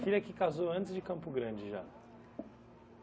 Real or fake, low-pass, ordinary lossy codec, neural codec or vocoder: real; none; none; none